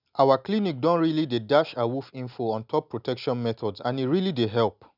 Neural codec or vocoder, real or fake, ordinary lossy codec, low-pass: none; real; none; 5.4 kHz